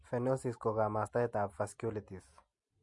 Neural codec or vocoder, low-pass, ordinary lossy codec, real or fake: none; 10.8 kHz; MP3, 48 kbps; real